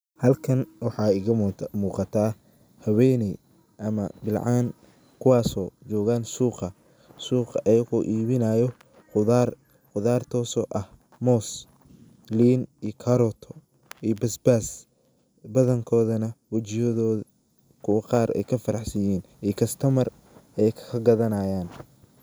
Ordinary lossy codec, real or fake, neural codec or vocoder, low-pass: none; real; none; none